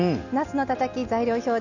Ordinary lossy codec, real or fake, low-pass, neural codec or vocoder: none; real; 7.2 kHz; none